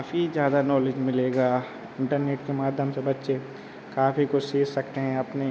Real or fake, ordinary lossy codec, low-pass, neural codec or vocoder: real; none; none; none